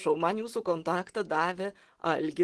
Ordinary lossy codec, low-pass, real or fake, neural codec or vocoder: Opus, 16 kbps; 10.8 kHz; real; none